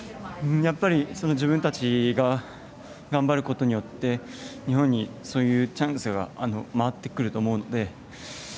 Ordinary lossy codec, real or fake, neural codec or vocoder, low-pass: none; real; none; none